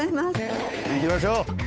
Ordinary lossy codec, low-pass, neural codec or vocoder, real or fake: none; none; codec, 16 kHz, 8 kbps, FunCodec, trained on Chinese and English, 25 frames a second; fake